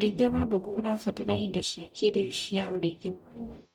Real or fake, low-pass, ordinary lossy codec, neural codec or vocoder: fake; 19.8 kHz; none; codec, 44.1 kHz, 0.9 kbps, DAC